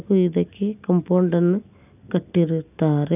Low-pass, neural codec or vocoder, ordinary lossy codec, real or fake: 3.6 kHz; none; none; real